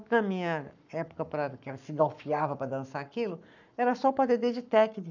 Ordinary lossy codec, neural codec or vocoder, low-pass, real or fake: none; codec, 44.1 kHz, 7.8 kbps, Pupu-Codec; 7.2 kHz; fake